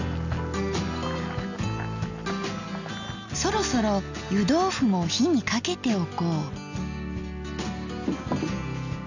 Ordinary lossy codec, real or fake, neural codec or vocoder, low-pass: none; real; none; 7.2 kHz